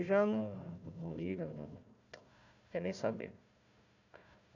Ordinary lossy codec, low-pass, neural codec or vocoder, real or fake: none; 7.2 kHz; codec, 16 kHz, 1 kbps, FunCodec, trained on Chinese and English, 50 frames a second; fake